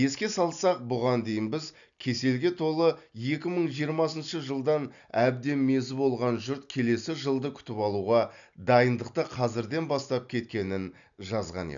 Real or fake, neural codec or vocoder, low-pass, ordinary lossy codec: real; none; 7.2 kHz; none